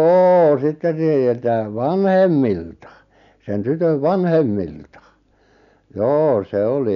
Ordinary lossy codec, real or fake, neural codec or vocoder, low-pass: none; real; none; 7.2 kHz